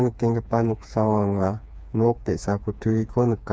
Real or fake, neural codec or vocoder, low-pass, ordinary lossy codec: fake; codec, 16 kHz, 4 kbps, FreqCodec, smaller model; none; none